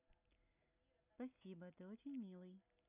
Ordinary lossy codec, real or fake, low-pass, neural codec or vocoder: none; real; 3.6 kHz; none